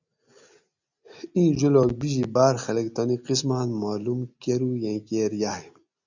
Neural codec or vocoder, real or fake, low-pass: none; real; 7.2 kHz